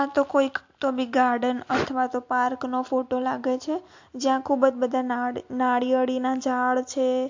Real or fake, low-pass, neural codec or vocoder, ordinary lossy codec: real; 7.2 kHz; none; MP3, 48 kbps